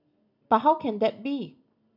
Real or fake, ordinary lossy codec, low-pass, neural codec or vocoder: real; none; 5.4 kHz; none